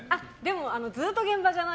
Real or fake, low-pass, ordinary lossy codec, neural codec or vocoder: real; none; none; none